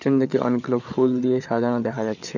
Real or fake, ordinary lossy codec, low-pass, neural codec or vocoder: fake; none; 7.2 kHz; codec, 16 kHz, 8 kbps, FreqCodec, larger model